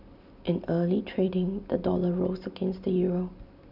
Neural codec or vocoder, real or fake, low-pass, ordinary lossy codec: none; real; 5.4 kHz; none